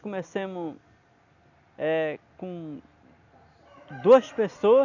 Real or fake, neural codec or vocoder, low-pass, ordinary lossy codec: real; none; 7.2 kHz; none